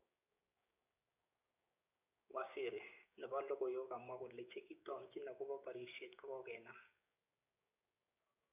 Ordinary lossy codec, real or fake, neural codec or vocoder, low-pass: none; fake; codec, 16 kHz, 6 kbps, DAC; 3.6 kHz